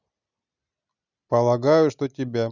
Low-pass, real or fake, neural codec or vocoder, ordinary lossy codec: 7.2 kHz; real; none; none